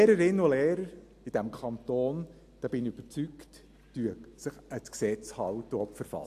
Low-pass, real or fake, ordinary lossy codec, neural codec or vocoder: 14.4 kHz; real; AAC, 64 kbps; none